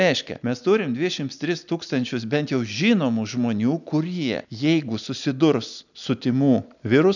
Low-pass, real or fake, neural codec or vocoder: 7.2 kHz; real; none